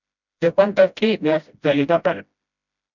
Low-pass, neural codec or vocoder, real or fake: 7.2 kHz; codec, 16 kHz, 0.5 kbps, FreqCodec, smaller model; fake